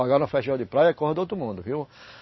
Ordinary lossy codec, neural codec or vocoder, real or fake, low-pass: MP3, 24 kbps; none; real; 7.2 kHz